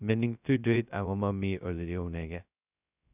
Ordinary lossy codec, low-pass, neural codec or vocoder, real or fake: none; 3.6 kHz; codec, 16 kHz, 0.2 kbps, FocalCodec; fake